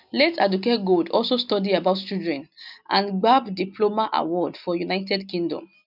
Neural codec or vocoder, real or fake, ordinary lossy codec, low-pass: none; real; none; 5.4 kHz